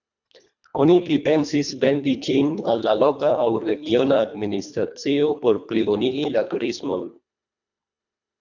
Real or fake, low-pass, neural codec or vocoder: fake; 7.2 kHz; codec, 24 kHz, 1.5 kbps, HILCodec